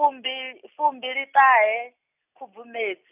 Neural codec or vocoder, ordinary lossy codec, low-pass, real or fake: none; none; 3.6 kHz; real